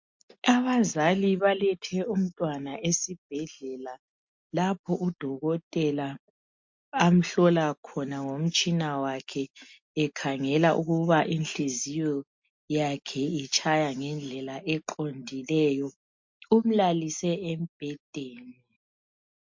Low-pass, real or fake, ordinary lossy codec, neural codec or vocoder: 7.2 kHz; real; MP3, 48 kbps; none